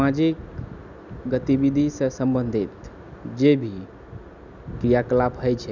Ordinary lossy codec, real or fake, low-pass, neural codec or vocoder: none; real; 7.2 kHz; none